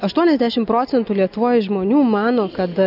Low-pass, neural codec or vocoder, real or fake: 5.4 kHz; none; real